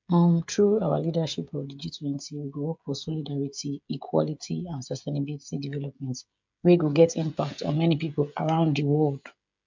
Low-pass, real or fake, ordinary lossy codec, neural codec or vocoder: 7.2 kHz; fake; MP3, 64 kbps; codec, 16 kHz, 16 kbps, FreqCodec, smaller model